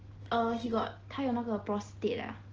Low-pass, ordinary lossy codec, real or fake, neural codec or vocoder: 7.2 kHz; Opus, 16 kbps; real; none